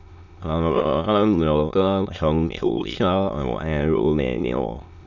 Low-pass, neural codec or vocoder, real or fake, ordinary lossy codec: 7.2 kHz; autoencoder, 22.05 kHz, a latent of 192 numbers a frame, VITS, trained on many speakers; fake; none